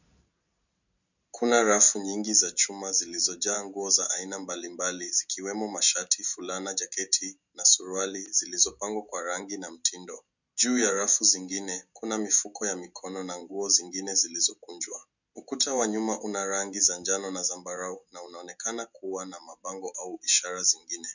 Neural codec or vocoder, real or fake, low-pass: none; real; 7.2 kHz